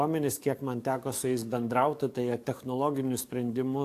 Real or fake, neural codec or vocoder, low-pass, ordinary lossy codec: fake; codec, 44.1 kHz, 7.8 kbps, DAC; 14.4 kHz; AAC, 64 kbps